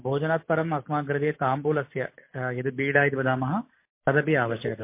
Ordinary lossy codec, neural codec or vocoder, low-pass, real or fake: MP3, 24 kbps; none; 3.6 kHz; real